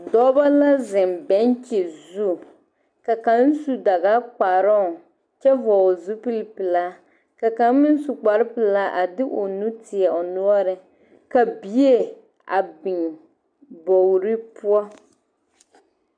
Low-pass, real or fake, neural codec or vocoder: 9.9 kHz; real; none